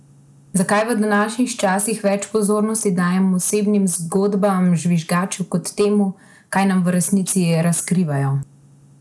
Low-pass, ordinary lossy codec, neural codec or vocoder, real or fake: none; none; none; real